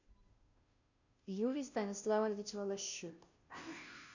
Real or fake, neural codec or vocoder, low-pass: fake; codec, 16 kHz, 0.5 kbps, FunCodec, trained on Chinese and English, 25 frames a second; 7.2 kHz